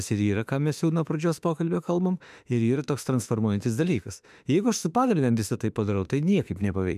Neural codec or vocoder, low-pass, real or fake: autoencoder, 48 kHz, 32 numbers a frame, DAC-VAE, trained on Japanese speech; 14.4 kHz; fake